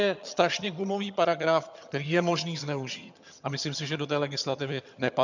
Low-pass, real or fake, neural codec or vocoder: 7.2 kHz; fake; vocoder, 22.05 kHz, 80 mel bands, HiFi-GAN